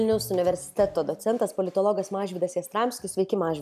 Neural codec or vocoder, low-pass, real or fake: none; 14.4 kHz; real